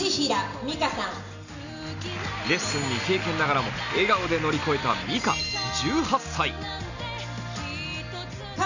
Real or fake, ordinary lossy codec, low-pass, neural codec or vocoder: real; none; 7.2 kHz; none